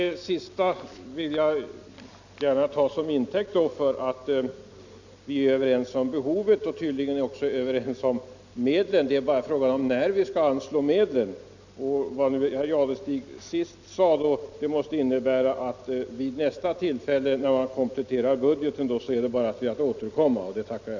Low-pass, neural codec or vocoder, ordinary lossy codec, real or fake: 7.2 kHz; none; none; real